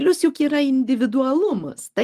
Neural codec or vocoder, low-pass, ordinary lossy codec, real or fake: none; 14.4 kHz; Opus, 24 kbps; real